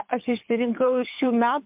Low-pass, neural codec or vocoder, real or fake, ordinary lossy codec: 3.6 kHz; codec, 24 kHz, 6 kbps, HILCodec; fake; MP3, 32 kbps